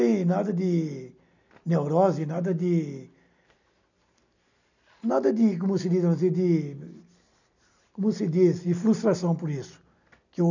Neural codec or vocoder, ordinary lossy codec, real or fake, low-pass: none; none; real; 7.2 kHz